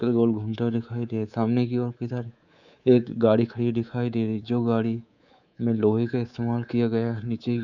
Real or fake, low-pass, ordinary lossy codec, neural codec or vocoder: fake; 7.2 kHz; none; codec, 24 kHz, 3.1 kbps, DualCodec